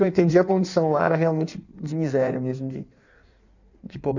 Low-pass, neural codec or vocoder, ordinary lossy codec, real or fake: 7.2 kHz; codec, 16 kHz in and 24 kHz out, 1.1 kbps, FireRedTTS-2 codec; none; fake